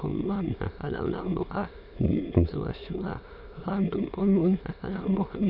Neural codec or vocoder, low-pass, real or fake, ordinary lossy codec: autoencoder, 22.05 kHz, a latent of 192 numbers a frame, VITS, trained on many speakers; 5.4 kHz; fake; none